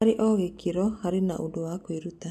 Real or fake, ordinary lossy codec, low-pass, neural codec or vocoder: real; MP3, 64 kbps; 14.4 kHz; none